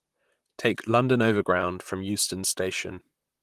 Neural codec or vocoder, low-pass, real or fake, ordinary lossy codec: vocoder, 44.1 kHz, 128 mel bands, Pupu-Vocoder; 14.4 kHz; fake; Opus, 24 kbps